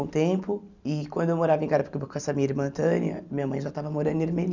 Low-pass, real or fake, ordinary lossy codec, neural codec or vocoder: 7.2 kHz; real; none; none